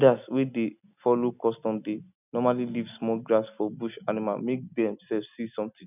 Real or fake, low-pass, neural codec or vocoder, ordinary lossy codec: real; 3.6 kHz; none; none